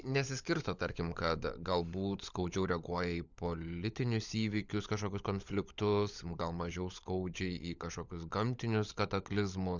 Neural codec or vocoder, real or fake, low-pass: codec, 16 kHz, 16 kbps, FunCodec, trained on LibriTTS, 50 frames a second; fake; 7.2 kHz